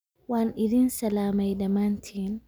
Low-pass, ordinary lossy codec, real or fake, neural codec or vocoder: none; none; real; none